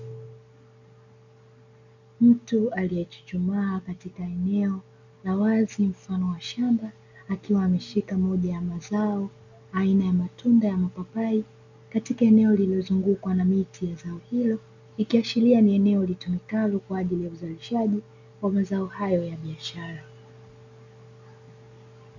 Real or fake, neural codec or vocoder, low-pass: real; none; 7.2 kHz